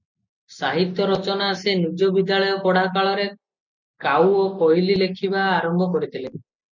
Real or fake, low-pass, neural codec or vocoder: real; 7.2 kHz; none